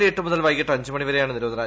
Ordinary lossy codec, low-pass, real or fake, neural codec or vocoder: none; none; real; none